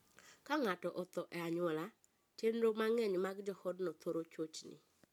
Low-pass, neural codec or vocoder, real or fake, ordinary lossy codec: 19.8 kHz; none; real; none